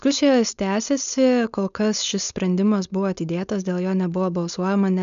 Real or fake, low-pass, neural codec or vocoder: fake; 7.2 kHz; codec, 16 kHz, 4.8 kbps, FACodec